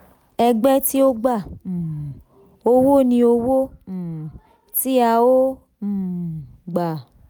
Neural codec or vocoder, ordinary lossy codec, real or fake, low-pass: none; none; real; none